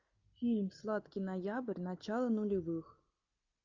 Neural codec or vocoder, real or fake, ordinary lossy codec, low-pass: none; real; Opus, 64 kbps; 7.2 kHz